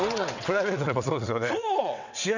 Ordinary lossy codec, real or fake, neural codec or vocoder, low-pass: none; fake; vocoder, 22.05 kHz, 80 mel bands, WaveNeXt; 7.2 kHz